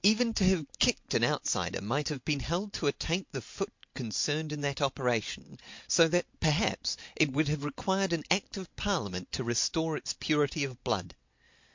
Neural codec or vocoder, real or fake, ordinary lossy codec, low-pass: none; real; MP3, 48 kbps; 7.2 kHz